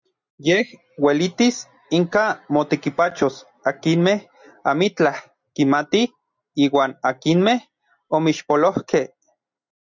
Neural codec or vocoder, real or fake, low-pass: none; real; 7.2 kHz